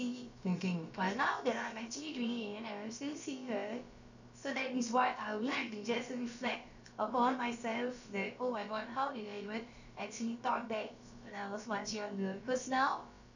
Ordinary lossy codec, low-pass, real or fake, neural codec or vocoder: none; 7.2 kHz; fake; codec, 16 kHz, about 1 kbps, DyCAST, with the encoder's durations